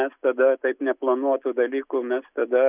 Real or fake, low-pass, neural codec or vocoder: real; 3.6 kHz; none